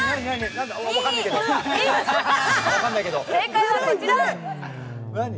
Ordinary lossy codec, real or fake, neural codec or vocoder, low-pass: none; real; none; none